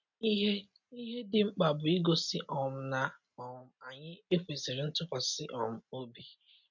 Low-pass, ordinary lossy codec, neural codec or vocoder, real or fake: 7.2 kHz; MP3, 48 kbps; none; real